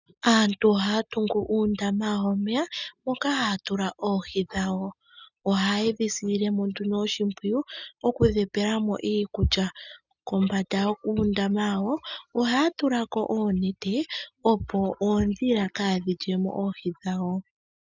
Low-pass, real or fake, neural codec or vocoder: 7.2 kHz; real; none